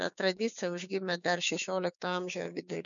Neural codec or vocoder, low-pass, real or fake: codec, 16 kHz, 6 kbps, DAC; 7.2 kHz; fake